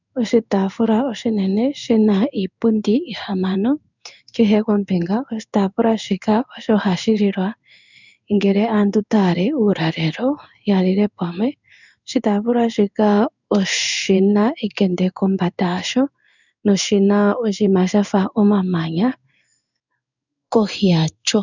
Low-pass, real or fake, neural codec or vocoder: 7.2 kHz; fake; codec, 16 kHz in and 24 kHz out, 1 kbps, XY-Tokenizer